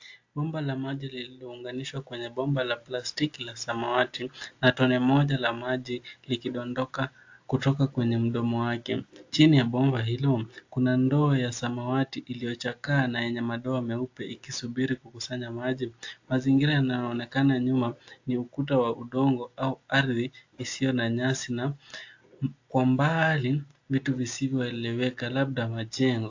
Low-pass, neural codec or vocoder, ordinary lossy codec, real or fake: 7.2 kHz; none; AAC, 48 kbps; real